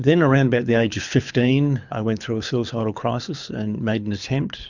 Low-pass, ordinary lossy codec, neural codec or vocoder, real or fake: 7.2 kHz; Opus, 64 kbps; codec, 24 kHz, 6 kbps, HILCodec; fake